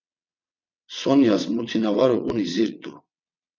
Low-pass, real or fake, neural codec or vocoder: 7.2 kHz; fake; vocoder, 22.05 kHz, 80 mel bands, WaveNeXt